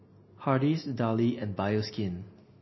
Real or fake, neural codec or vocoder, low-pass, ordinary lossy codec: real; none; 7.2 kHz; MP3, 24 kbps